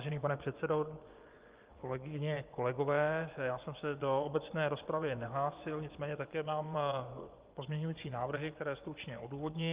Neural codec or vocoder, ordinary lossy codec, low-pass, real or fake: vocoder, 24 kHz, 100 mel bands, Vocos; Opus, 32 kbps; 3.6 kHz; fake